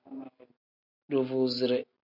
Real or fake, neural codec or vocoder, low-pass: real; none; 5.4 kHz